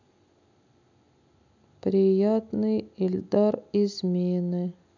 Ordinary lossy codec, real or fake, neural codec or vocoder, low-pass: none; real; none; 7.2 kHz